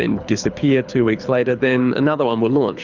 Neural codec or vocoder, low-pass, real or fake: codec, 24 kHz, 6 kbps, HILCodec; 7.2 kHz; fake